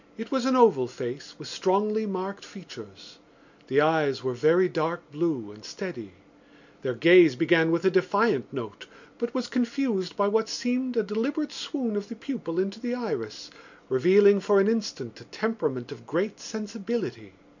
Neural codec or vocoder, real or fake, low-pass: none; real; 7.2 kHz